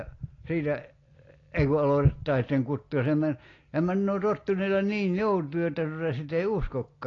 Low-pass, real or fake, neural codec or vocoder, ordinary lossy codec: 7.2 kHz; real; none; AAC, 32 kbps